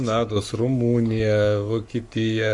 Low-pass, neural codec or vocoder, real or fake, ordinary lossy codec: 10.8 kHz; codec, 44.1 kHz, 7.8 kbps, Pupu-Codec; fake; MP3, 64 kbps